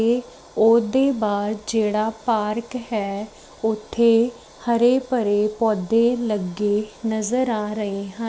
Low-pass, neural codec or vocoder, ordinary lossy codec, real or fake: none; none; none; real